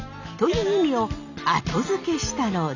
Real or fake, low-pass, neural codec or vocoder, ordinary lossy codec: real; 7.2 kHz; none; none